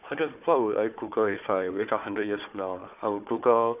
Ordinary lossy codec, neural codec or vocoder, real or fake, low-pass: none; codec, 16 kHz, 4 kbps, FunCodec, trained on Chinese and English, 50 frames a second; fake; 3.6 kHz